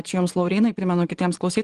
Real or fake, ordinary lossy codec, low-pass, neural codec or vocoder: fake; Opus, 24 kbps; 10.8 kHz; vocoder, 24 kHz, 100 mel bands, Vocos